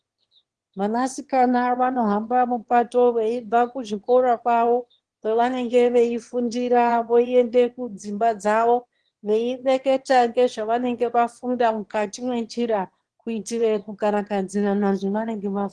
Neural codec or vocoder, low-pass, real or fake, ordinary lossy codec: autoencoder, 22.05 kHz, a latent of 192 numbers a frame, VITS, trained on one speaker; 9.9 kHz; fake; Opus, 16 kbps